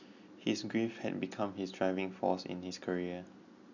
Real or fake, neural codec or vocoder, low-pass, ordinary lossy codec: real; none; 7.2 kHz; none